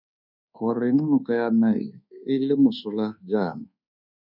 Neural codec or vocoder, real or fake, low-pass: codec, 24 kHz, 1.2 kbps, DualCodec; fake; 5.4 kHz